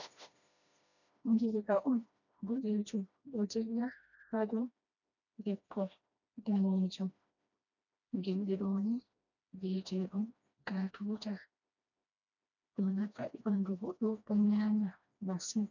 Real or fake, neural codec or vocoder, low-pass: fake; codec, 16 kHz, 1 kbps, FreqCodec, smaller model; 7.2 kHz